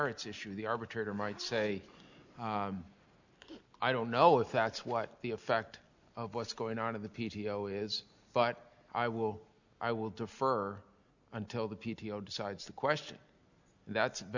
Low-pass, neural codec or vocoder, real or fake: 7.2 kHz; none; real